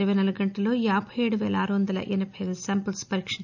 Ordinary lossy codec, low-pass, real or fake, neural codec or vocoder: none; 7.2 kHz; real; none